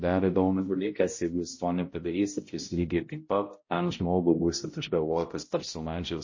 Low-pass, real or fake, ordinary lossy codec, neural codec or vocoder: 7.2 kHz; fake; MP3, 32 kbps; codec, 16 kHz, 0.5 kbps, X-Codec, HuBERT features, trained on balanced general audio